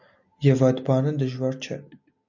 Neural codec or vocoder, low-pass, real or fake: none; 7.2 kHz; real